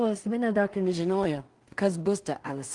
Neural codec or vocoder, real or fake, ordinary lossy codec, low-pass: codec, 16 kHz in and 24 kHz out, 0.4 kbps, LongCat-Audio-Codec, two codebook decoder; fake; Opus, 24 kbps; 10.8 kHz